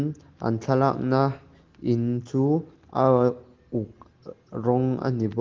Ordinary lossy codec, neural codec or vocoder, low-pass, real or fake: Opus, 16 kbps; none; 7.2 kHz; real